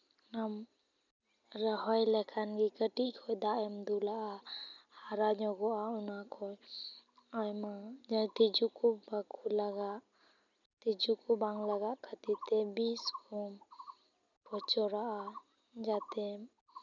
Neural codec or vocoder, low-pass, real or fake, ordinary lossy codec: none; 7.2 kHz; real; none